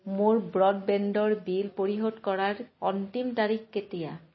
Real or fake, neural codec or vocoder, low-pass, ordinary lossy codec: real; none; 7.2 kHz; MP3, 24 kbps